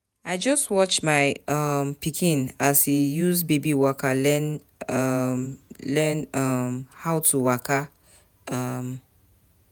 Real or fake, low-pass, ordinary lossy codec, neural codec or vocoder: fake; none; none; vocoder, 48 kHz, 128 mel bands, Vocos